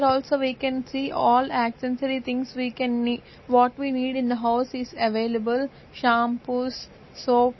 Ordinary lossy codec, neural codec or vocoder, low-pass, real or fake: MP3, 24 kbps; none; 7.2 kHz; real